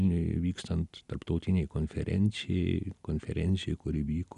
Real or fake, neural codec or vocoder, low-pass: real; none; 10.8 kHz